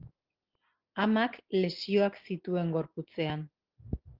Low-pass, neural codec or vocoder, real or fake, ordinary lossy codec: 5.4 kHz; none; real; Opus, 16 kbps